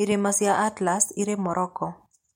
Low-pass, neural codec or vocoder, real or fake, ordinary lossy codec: 19.8 kHz; none; real; MP3, 64 kbps